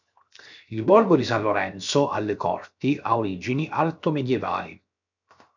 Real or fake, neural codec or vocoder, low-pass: fake; codec, 16 kHz, 0.7 kbps, FocalCodec; 7.2 kHz